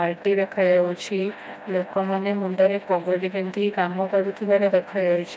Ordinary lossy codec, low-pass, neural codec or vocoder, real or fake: none; none; codec, 16 kHz, 1 kbps, FreqCodec, smaller model; fake